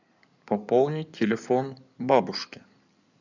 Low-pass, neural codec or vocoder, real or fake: 7.2 kHz; codec, 16 kHz in and 24 kHz out, 2.2 kbps, FireRedTTS-2 codec; fake